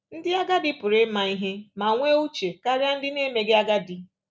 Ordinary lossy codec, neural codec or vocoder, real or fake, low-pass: none; none; real; none